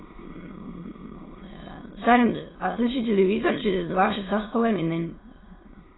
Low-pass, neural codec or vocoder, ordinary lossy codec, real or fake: 7.2 kHz; autoencoder, 22.05 kHz, a latent of 192 numbers a frame, VITS, trained on many speakers; AAC, 16 kbps; fake